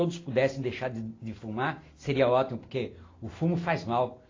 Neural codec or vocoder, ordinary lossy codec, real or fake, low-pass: none; AAC, 32 kbps; real; 7.2 kHz